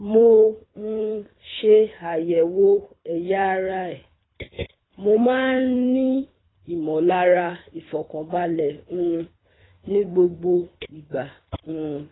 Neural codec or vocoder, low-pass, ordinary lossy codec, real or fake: codec, 24 kHz, 3 kbps, HILCodec; 7.2 kHz; AAC, 16 kbps; fake